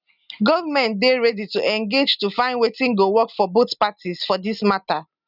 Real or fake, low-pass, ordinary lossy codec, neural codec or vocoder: real; 5.4 kHz; none; none